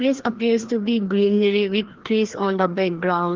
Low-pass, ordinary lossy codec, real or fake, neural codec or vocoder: 7.2 kHz; Opus, 16 kbps; fake; codec, 16 kHz, 1 kbps, FreqCodec, larger model